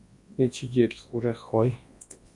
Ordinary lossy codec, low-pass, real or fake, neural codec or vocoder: MP3, 48 kbps; 10.8 kHz; fake; codec, 24 kHz, 0.9 kbps, WavTokenizer, large speech release